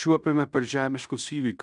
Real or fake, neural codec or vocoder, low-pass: fake; codec, 16 kHz in and 24 kHz out, 0.9 kbps, LongCat-Audio-Codec, fine tuned four codebook decoder; 10.8 kHz